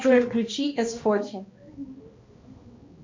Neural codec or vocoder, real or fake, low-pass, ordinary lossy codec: codec, 16 kHz, 1 kbps, X-Codec, HuBERT features, trained on balanced general audio; fake; 7.2 kHz; MP3, 48 kbps